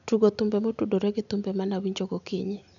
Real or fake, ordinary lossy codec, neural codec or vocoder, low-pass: real; none; none; 7.2 kHz